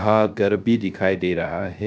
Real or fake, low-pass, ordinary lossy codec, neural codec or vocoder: fake; none; none; codec, 16 kHz, 0.3 kbps, FocalCodec